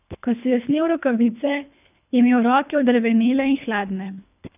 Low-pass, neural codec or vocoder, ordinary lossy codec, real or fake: 3.6 kHz; codec, 24 kHz, 3 kbps, HILCodec; none; fake